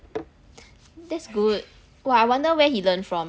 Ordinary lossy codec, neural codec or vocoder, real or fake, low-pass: none; none; real; none